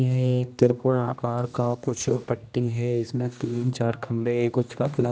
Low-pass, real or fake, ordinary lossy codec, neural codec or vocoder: none; fake; none; codec, 16 kHz, 1 kbps, X-Codec, HuBERT features, trained on general audio